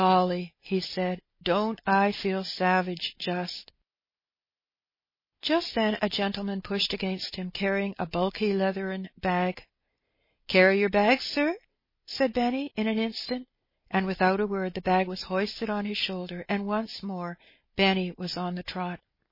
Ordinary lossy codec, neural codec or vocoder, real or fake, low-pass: MP3, 24 kbps; none; real; 5.4 kHz